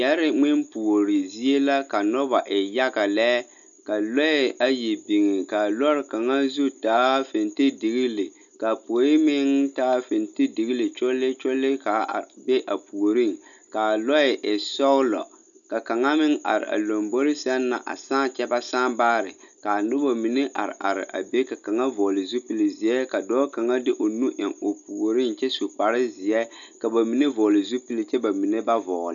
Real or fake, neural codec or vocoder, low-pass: real; none; 7.2 kHz